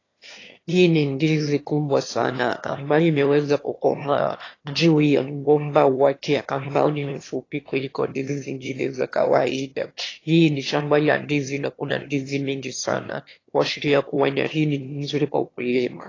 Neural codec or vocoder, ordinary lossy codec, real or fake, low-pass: autoencoder, 22.05 kHz, a latent of 192 numbers a frame, VITS, trained on one speaker; AAC, 32 kbps; fake; 7.2 kHz